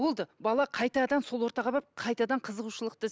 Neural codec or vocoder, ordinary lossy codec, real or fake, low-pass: none; none; real; none